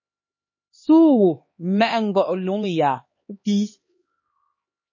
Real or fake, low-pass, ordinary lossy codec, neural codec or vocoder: fake; 7.2 kHz; MP3, 32 kbps; codec, 16 kHz, 2 kbps, X-Codec, HuBERT features, trained on LibriSpeech